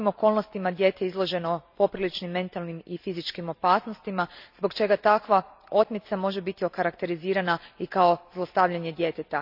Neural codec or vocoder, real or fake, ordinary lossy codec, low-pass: none; real; none; 5.4 kHz